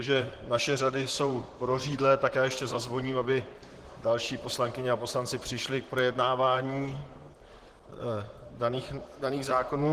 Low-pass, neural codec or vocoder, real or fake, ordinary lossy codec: 14.4 kHz; vocoder, 44.1 kHz, 128 mel bands, Pupu-Vocoder; fake; Opus, 16 kbps